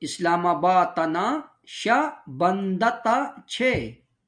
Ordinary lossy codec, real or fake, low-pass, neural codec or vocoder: MP3, 64 kbps; real; 9.9 kHz; none